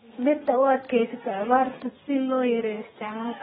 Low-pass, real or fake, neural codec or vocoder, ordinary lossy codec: 14.4 kHz; fake; codec, 32 kHz, 1.9 kbps, SNAC; AAC, 16 kbps